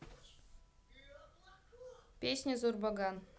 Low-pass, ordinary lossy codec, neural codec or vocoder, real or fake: none; none; none; real